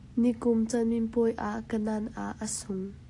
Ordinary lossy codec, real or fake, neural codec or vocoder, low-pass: AAC, 48 kbps; real; none; 10.8 kHz